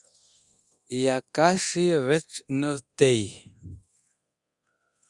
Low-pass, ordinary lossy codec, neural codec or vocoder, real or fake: 10.8 kHz; Opus, 64 kbps; codec, 24 kHz, 0.9 kbps, DualCodec; fake